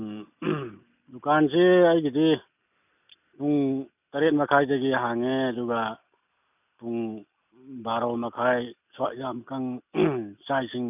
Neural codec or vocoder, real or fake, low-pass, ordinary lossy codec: none; real; 3.6 kHz; none